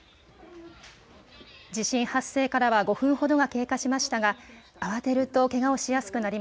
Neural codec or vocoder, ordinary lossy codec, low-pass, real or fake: none; none; none; real